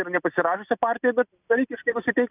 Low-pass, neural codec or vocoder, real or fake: 3.6 kHz; none; real